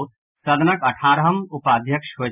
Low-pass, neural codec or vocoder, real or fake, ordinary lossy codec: 3.6 kHz; none; real; none